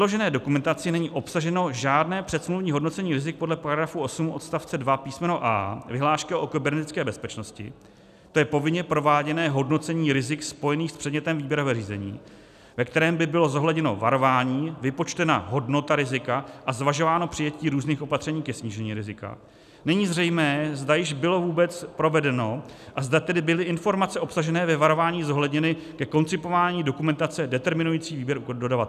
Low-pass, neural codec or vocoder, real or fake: 14.4 kHz; none; real